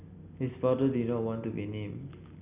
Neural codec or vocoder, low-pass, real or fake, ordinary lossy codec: none; 3.6 kHz; real; Opus, 64 kbps